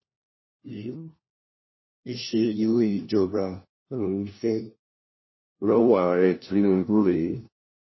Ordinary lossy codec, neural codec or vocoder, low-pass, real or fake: MP3, 24 kbps; codec, 16 kHz, 1 kbps, FunCodec, trained on LibriTTS, 50 frames a second; 7.2 kHz; fake